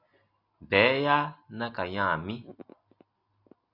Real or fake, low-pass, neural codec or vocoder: real; 5.4 kHz; none